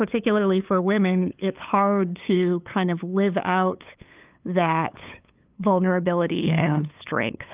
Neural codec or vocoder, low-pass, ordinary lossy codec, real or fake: codec, 16 kHz, 8 kbps, FunCodec, trained on LibriTTS, 25 frames a second; 3.6 kHz; Opus, 24 kbps; fake